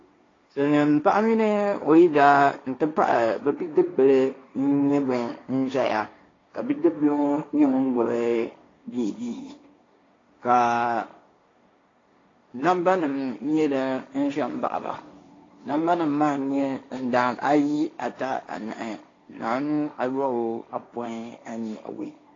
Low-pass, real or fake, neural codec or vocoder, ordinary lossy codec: 7.2 kHz; fake; codec, 16 kHz, 1.1 kbps, Voila-Tokenizer; AAC, 32 kbps